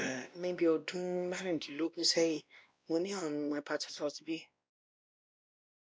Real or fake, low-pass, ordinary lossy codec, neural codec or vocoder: fake; none; none; codec, 16 kHz, 1 kbps, X-Codec, WavLM features, trained on Multilingual LibriSpeech